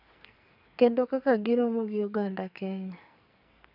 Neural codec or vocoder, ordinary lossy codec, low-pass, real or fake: codec, 44.1 kHz, 2.6 kbps, SNAC; none; 5.4 kHz; fake